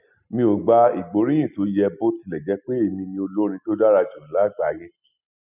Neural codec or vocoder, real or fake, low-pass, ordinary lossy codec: none; real; 3.6 kHz; none